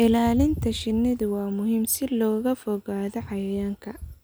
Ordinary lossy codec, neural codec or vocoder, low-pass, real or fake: none; none; none; real